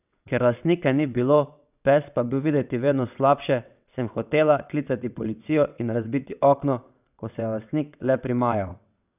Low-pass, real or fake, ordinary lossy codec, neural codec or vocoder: 3.6 kHz; fake; none; vocoder, 22.05 kHz, 80 mel bands, WaveNeXt